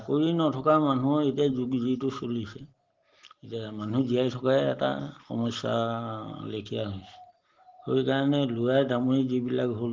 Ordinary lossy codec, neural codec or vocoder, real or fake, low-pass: Opus, 16 kbps; none; real; 7.2 kHz